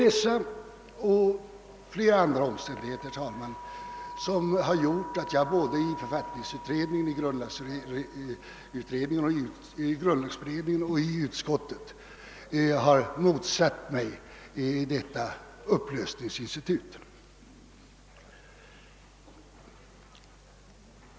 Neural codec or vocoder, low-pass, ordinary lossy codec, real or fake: none; none; none; real